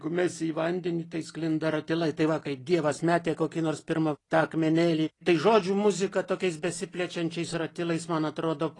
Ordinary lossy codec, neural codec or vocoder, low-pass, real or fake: AAC, 32 kbps; none; 10.8 kHz; real